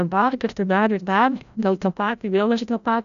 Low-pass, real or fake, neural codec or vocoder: 7.2 kHz; fake; codec, 16 kHz, 0.5 kbps, FreqCodec, larger model